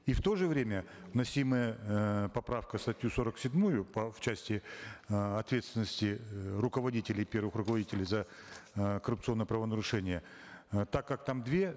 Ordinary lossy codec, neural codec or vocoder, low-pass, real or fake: none; none; none; real